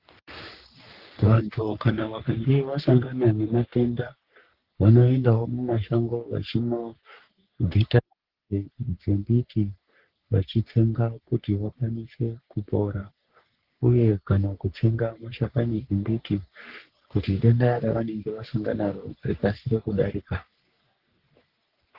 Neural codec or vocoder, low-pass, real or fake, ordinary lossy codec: codec, 44.1 kHz, 3.4 kbps, Pupu-Codec; 5.4 kHz; fake; Opus, 16 kbps